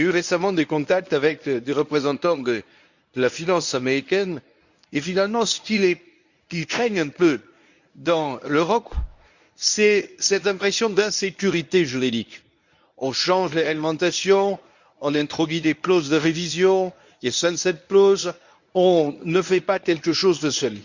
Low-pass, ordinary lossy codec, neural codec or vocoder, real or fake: 7.2 kHz; none; codec, 24 kHz, 0.9 kbps, WavTokenizer, medium speech release version 1; fake